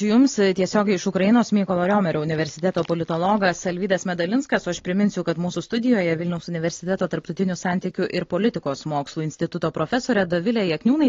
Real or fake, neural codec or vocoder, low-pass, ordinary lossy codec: real; none; 7.2 kHz; AAC, 32 kbps